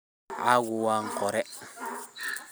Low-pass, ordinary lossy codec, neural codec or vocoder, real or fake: none; none; vocoder, 44.1 kHz, 128 mel bands every 256 samples, BigVGAN v2; fake